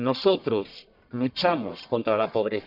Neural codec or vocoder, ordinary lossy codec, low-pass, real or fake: codec, 44.1 kHz, 1.7 kbps, Pupu-Codec; none; 5.4 kHz; fake